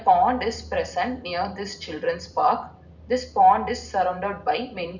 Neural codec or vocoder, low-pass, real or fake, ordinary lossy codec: none; 7.2 kHz; real; none